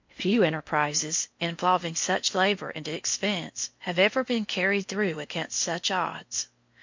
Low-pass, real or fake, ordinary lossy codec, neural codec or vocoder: 7.2 kHz; fake; MP3, 48 kbps; codec, 16 kHz in and 24 kHz out, 0.6 kbps, FocalCodec, streaming, 4096 codes